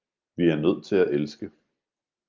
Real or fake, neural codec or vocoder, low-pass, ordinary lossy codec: real; none; 7.2 kHz; Opus, 32 kbps